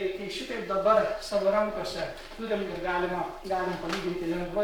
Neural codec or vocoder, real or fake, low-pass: codec, 44.1 kHz, 7.8 kbps, Pupu-Codec; fake; 19.8 kHz